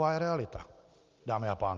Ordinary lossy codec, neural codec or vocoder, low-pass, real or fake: Opus, 24 kbps; none; 7.2 kHz; real